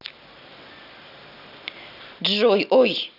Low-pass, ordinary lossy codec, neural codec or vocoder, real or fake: 5.4 kHz; none; none; real